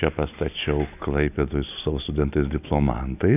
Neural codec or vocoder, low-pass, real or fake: none; 3.6 kHz; real